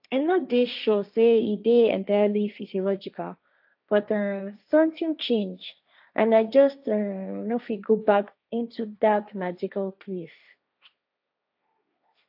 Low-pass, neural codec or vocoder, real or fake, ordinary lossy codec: 5.4 kHz; codec, 16 kHz, 1.1 kbps, Voila-Tokenizer; fake; AAC, 48 kbps